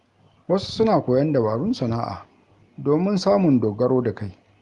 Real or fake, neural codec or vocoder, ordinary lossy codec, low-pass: real; none; Opus, 24 kbps; 10.8 kHz